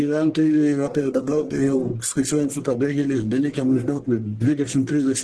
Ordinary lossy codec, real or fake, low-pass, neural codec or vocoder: Opus, 16 kbps; fake; 10.8 kHz; codec, 44.1 kHz, 1.7 kbps, Pupu-Codec